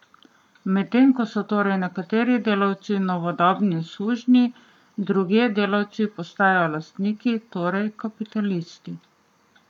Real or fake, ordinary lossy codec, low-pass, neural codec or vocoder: fake; none; 19.8 kHz; codec, 44.1 kHz, 7.8 kbps, Pupu-Codec